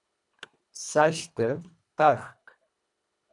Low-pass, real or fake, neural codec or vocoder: 10.8 kHz; fake; codec, 24 kHz, 1.5 kbps, HILCodec